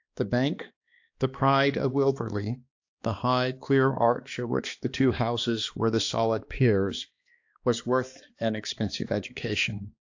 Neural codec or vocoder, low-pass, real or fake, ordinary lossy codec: codec, 16 kHz, 2 kbps, X-Codec, HuBERT features, trained on balanced general audio; 7.2 kHz; fake; AAC, 48 kbps